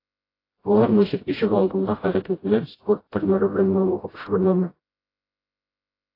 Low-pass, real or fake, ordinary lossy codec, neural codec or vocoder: 5.4 kHz; fake; AAC, 24 kbps; codec, 16 kHz, 0.5 kbps, FreqCodec, smaller model